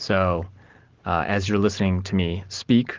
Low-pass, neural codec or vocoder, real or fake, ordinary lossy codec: 7.2 kHz; none; real; Opus, 16 kbps